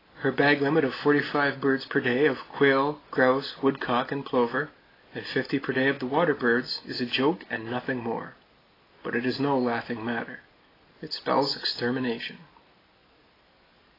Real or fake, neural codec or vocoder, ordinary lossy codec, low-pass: real; none; AAC, 24 kbps; 5.4 kHz